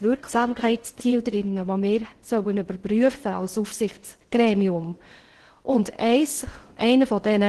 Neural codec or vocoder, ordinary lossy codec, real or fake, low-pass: codec, 16 kHz in and 24 kHz out, 0.6 kbps, FocalCodec, streaming, 2048 codes; Opus, 16 kbps; fake; 10.8 kHz